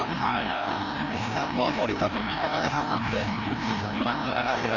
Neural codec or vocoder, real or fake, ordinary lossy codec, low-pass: codec, 16 kHz, 1 kbps, FreqCodec, larger model; fake; none; 7.2 kHz